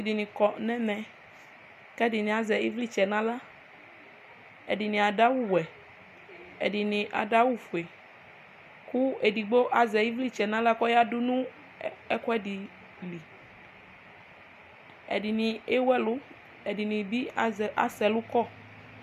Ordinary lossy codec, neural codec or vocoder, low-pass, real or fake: MP3, 96 kbps; none; 14.4 kHz; real